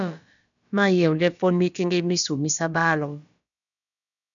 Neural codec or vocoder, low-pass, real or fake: codec, 16 kHz, about 1 kbps, DyCAST, with the encoder's durations; 7.2 kHz; fake